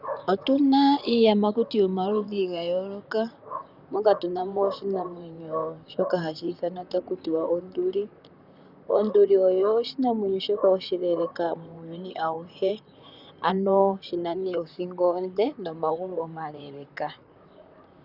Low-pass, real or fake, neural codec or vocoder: 5.4 kHz; fake; vocoder, 44.1 kHz, 128 mel bands, Pupu-Vocoder